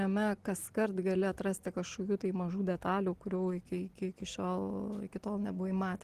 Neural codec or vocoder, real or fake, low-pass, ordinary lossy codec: none; real; 14.4 kHz; Opus, 16 kbps